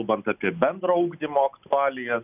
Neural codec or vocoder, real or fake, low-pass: none; real; 3.6 kHz